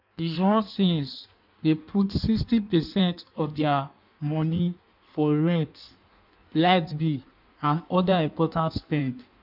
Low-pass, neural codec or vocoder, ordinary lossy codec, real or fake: 5.4 kHz; codec, 16 kHz in and 24 kHz out, 1.1 kbps, FireRedTTS-2 codec; none; fake